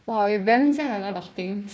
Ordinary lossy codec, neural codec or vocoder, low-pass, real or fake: none; codec, 16 kHz, 1 kbps, FunCodec, trained on Chinese and English, 50 frames a second; none; fake